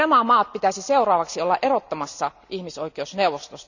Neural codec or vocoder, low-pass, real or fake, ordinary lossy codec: none; 7.2 kHz; real; none